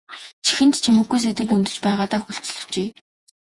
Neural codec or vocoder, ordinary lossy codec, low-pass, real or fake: vocoder, 48 kHz, 128 mel bands, Vocos; Opus, 64 kbps; 10.8 kHz; fake